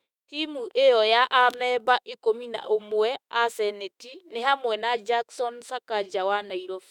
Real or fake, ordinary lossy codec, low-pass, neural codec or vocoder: fake; none; 19.8 kHz; autoencoder, 48 kHz, 32 numbers a frame, DAC-VAE, trained on Japanese speech